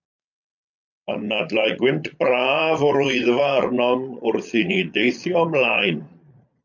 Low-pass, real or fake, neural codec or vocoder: 7.2 kHz; fake; vocoder, 22.05 kHz, 80 mel bands, Vocos